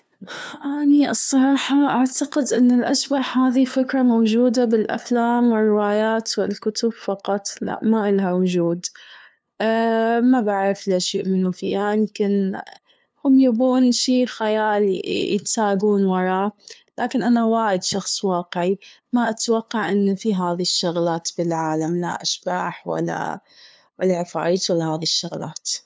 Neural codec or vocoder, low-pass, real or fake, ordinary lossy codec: codec, 16 kHz, 2 kbps, FunCodec, trained on LibriTTS, 25 frames a second; none; fake; none